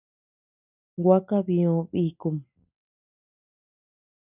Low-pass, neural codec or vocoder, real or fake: 3.6 kHz; none; real